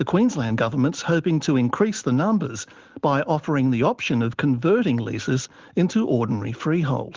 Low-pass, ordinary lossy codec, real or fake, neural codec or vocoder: 7.2 kHz; Opus, 16 kbps; real; none